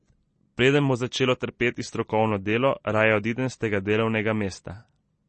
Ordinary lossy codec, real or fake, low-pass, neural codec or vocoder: MP3, 32 kbps; real; 10.8 kHz; none